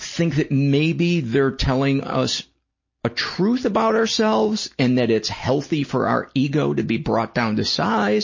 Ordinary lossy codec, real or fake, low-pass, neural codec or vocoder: MP3, 32 kbps; real; 7.2 kHz; none